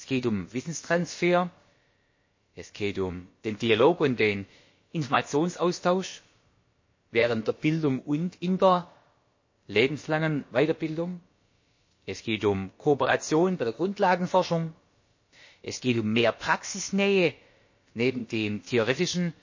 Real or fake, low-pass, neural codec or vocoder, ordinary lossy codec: fake; 7.2 kHz; codec, 16 kHz, about 1 kbps, DyCAST, with the encoder's durations; MP3, 32 kbps